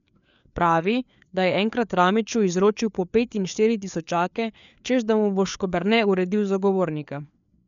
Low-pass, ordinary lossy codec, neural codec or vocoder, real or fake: 7.2 kHz; none; codec, 16 kHz, 4 kbps, FreqCodec, larger model; fake